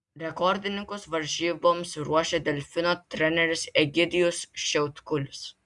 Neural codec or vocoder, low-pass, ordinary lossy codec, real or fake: none; 10.8 kHz; Opus, 64 kbps; real